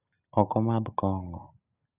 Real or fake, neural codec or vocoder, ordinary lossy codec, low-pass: real; none; none; 3.6 kHz